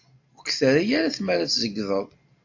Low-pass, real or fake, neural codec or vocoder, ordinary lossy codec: 7.2 kHz; real; none; AAC, 48 kbps